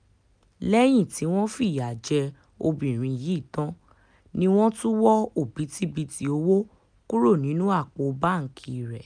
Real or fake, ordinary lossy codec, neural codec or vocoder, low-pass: real; none; none; 9.9 kHz